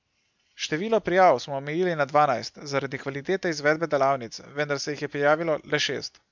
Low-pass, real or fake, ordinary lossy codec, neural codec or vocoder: 7.2 kHz; real; MP3, 64 kbps; none